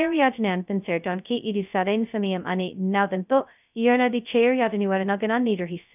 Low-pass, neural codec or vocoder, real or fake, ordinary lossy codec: 3.6 kHz; codec, 16 kHz, 0.2 kbps, FocalCodec; fake; none